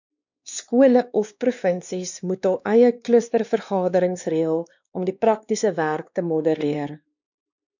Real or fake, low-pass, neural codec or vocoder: fake; 7.2 kHz; codec, 16 kHz, 2 kbps, X-Codec, WavLM features, trained on Multilingual LibriSpeech